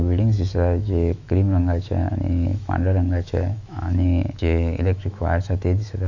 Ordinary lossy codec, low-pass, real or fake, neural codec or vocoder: none; 7.2 kHz; fake; autoencoder, 48 kHz, 128 numbers a frame, DAC-VAE, trained on Japanese speech